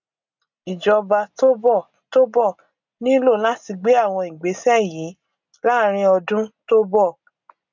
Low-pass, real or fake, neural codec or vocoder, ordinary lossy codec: 7.2 kHz; real; none; none